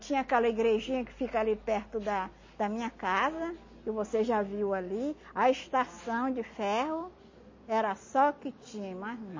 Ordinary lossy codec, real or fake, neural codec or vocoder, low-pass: MP3, 32 kbps; real; none; 7.2 kHz